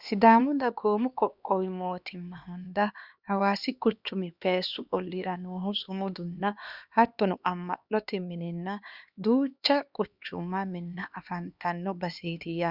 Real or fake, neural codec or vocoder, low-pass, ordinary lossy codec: fake; codec, 16 kHz, 2 kbps, X-Codec, HuBERT features, trained on LibriSpeech; 5.4 kHz; Opus, 64 kbps